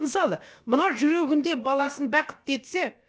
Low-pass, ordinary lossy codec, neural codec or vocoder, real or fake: none; none; codec, 16 kHz, about 1 kbps, DyCAST, with the encoder's durations; fake